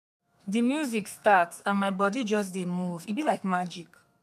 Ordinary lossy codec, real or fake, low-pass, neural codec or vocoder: none; fake; 14.4 kHz; codec, 32 kHz, 1.9 kbps, SNAC